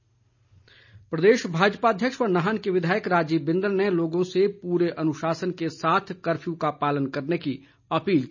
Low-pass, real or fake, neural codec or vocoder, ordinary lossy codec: 7.2 kHz; real; none; none